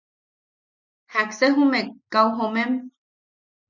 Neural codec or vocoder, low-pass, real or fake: none; 7.2 kHz; real